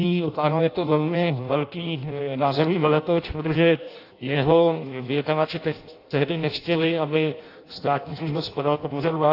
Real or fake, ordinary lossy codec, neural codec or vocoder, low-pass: fake; AAC, 32 kbps; codec, 16 kHz in and 24 kHz out, 0.6 kbps, FireRedTTS-2 codec; 5.4 kHz